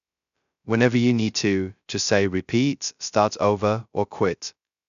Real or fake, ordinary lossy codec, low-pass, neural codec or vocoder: fake; none; 7.2 kHz; codec, 16 kHz, 0.2 kbps, FocalCodec